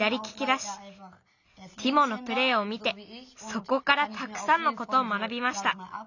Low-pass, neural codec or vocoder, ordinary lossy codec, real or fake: 7.2 kHz; none; none; real